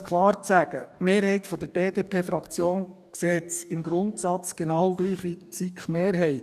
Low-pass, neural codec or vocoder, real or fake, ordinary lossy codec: 14.4 kHz; codec, 44.1 kHz, 2.6 kbps, DAC; fake; none